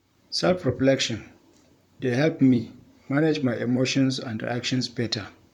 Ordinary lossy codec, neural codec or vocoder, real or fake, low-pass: none; vocoder, 44.1 kHz, 128 mel bands, Pupu-Vocoder; fake; 19.8 kHz